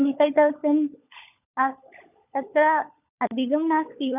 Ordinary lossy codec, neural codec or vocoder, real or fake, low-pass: none; codec, 16 kHz, 16 kbps, FunCodec, trained on LibriTTS, 50 frames a second; fake; 3.6 kHz